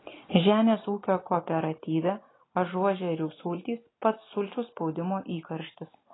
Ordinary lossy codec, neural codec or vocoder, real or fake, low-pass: AAC, 16 kbps; none; real; 7.2 kHz